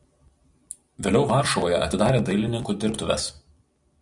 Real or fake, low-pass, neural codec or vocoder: real; 10.8 kHz; none